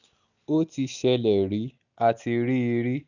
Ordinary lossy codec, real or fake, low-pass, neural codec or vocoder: none; real; 7.2 kHz; none